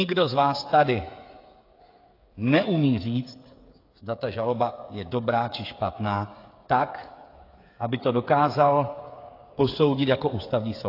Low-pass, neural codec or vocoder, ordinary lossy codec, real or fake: 5.4 kHz; codec, 16 kHz, 8 kbps, FreqCodec, smaller model; AAC, 32 kbps; fake